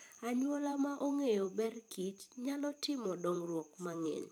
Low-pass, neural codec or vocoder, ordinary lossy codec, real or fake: 19.8 kHz; vocoder, 44.1 kHz, 128 mel bands every 512 samples, BigVGAN v2; none; fake